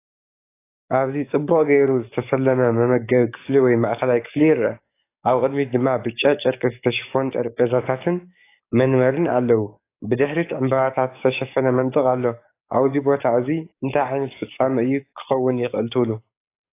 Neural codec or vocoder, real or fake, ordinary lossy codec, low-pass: codec, 44.1 kHz, 7.8 kbps, DAC; fake; AAC, 24 kbps; 3.6 kHz